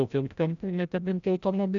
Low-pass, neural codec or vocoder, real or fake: 7.2 kHz; codec, 16 kHz, 0.5 kbps, FreqCodec, larger model; fake